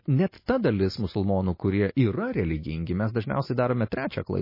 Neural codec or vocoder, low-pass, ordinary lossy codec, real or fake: none; 5.4 kHz; MP3, 24 kbps; real